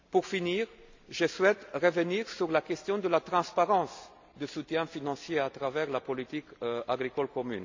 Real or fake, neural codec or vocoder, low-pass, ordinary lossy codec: real; none; 7.2 kHz; MP3, 64 kbps